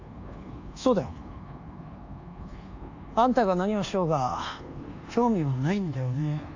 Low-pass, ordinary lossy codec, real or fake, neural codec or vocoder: 7.2 kHz; AAC, 48 kbps; fake; codec, 24 kHz, 1.2 kbps, DualCodec